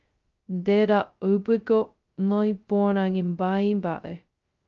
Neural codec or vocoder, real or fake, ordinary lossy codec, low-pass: codec, 16 kHz, 0.2 kbps, FocalCodec; fake; Opus, 24 kbps; 7.2 kHz